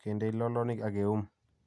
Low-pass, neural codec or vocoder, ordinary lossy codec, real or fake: none; none; none; real